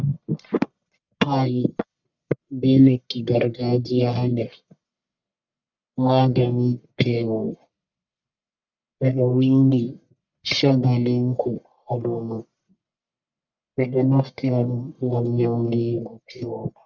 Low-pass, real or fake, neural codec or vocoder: 7.2 kHz; fake; codec, 44.1 kHz, 1.7 kbps, Pupu-Codec